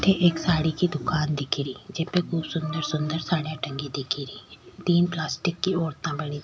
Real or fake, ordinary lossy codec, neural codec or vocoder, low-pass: real; none; none; none